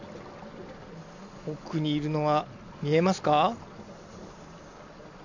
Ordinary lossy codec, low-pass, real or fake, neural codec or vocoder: none; 7.2 kHz; real; none